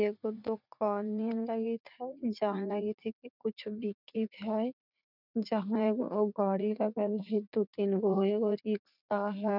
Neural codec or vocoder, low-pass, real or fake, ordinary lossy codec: vocoder, 44.1 kHz, 80 mel bands, Vocos; 5.4 kHz; fake; none